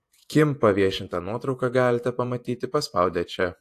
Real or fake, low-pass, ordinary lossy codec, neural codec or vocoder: fake; 14.4 kHz; AAC, 64 kbps; vocoder, 48 kHz, 128 mel bands, Vocos